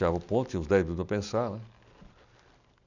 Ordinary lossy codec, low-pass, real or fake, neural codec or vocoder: none; 7.2 kHz; real; none